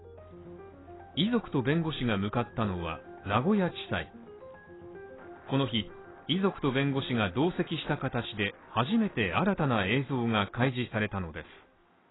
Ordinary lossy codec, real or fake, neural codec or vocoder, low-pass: AAC, 16 kbps; real; none; 7.2 kHz